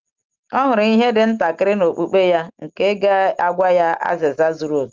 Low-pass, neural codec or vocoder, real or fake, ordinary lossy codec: 7.2 kHz; none; real; Opus, 32 kbps